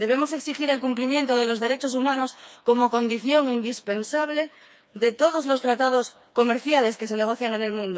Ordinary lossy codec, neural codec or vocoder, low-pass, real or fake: none; codec, 16 kHz, 2 kbps, FreqCodec, smaller model; none; fake